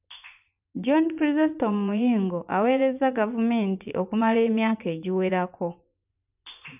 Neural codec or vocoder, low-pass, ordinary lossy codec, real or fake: vocoder, 24 kHz, 100 mel bands, Vocos; 3.6 kHz; none; fake